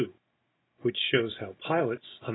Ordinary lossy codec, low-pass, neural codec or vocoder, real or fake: AAC, 16 kbps; 7.2 kHz; none; real